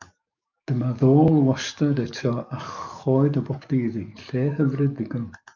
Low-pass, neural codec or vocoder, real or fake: 7.2 kHz; codec, 44.1 kHz, 7.8 kbps, DAC; fake